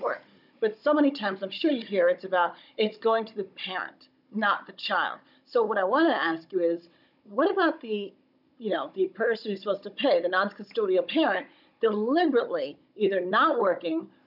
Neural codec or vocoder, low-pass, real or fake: codec, 16 kHz, 16 kbps, FunCodec, trained on Chinese and English, 50 frames a second; 5.4 kHz; fake